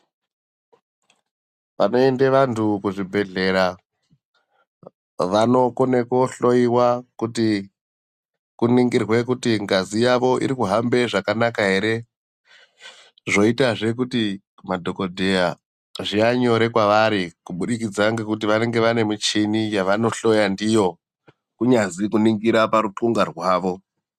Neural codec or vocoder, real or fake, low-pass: none; real; 14.4 kHz